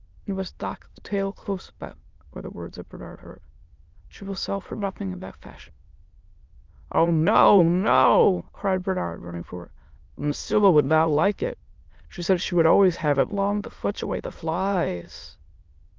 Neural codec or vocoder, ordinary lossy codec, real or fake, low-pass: autoencoder, 22.05 kHz, a latent of 192 numbers a frame, VITS, trained on many speakers; Opus, 24 kbps; fake; 7.2 kHz